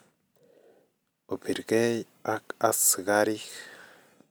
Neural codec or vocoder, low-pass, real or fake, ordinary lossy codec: none; none; real; none